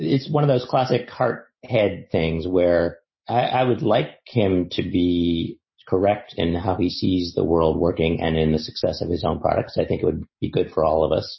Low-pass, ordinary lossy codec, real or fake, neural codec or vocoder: 7.2 kHz; MP3, 24 kbps; real; none